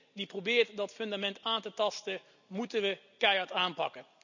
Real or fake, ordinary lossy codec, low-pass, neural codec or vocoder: real; none; 7.2 kHz; none